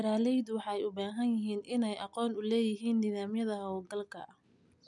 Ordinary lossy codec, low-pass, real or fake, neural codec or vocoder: none; 10.8 kHz; real; none